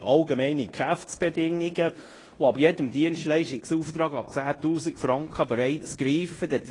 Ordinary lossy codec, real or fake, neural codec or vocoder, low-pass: AAC, 32 kbps; fake; codec, 16 kHz in and 24 kHz out, 0.9 kbps, LongCat-Audio-Codec, fine tuned four codebook decoder; 10.8 kHz